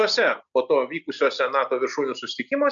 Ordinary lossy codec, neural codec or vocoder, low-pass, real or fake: MP3, 64 kbps; none; 7.2 kHz; real